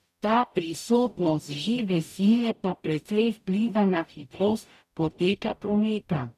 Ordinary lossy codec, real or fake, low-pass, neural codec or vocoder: none; fake; 14.4 kHz; codec, 44.1 kHz, 0.9 kbps, DAC